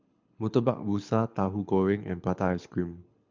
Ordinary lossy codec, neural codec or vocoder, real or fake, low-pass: MP3, 64 kbps; codec, 24 kHz, 6 kbps, HILCodec; fake; 7.2 kHz